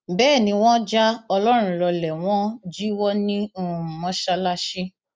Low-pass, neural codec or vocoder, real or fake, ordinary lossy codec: none; none; real; none